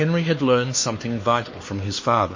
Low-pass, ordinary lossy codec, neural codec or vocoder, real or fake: 7.2 kHz; MP3, 32 kbps; codec, 16 kHz, 4 kbps, X-Codec, HuBERT features, trained on LibriSpeech; fake